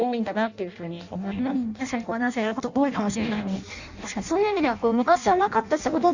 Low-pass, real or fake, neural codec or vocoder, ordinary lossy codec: 7.2 kHz; fake; codec, 16 kHz in and 24 kHz out, 0.6 kbps, FireRedTTS-2 codec; Opus, 64 kbps